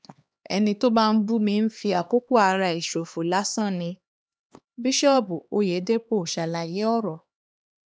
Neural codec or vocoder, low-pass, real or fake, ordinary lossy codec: codec, 16 kHz, 2 kbps, X-Codec, HuBERT features, trained on LibriSpeech; none; fake; none